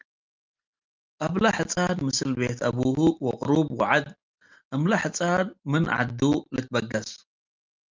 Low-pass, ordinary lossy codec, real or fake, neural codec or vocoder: 7.2 kHz; Opus, 24 kbps; real; none